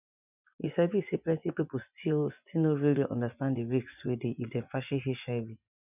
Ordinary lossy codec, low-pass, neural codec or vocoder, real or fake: none; 3.6 kHz; vocoder, 24 kHz, 100 mel bands, Vocos; fake